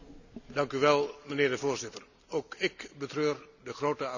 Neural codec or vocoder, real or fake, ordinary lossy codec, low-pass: none; real; none; 7.2 kHz